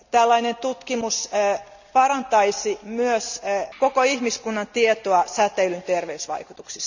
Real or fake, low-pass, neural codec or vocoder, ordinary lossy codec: real; 7.2 kHz; none; none